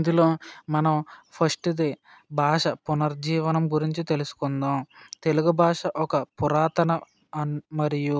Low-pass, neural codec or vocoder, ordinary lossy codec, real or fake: none; none; none; real